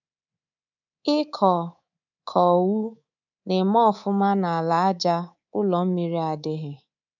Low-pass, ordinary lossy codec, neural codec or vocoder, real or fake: 7.2 kHz; none; codec, 24 kHz, 3.1 kbps, DualCodec; fake